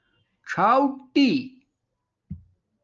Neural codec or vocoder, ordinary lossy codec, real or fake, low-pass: none; Opus, 24 kbps; real; 7.2 kHz